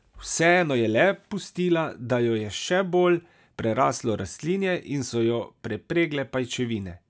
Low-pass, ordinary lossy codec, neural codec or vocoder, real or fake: none; none; none; real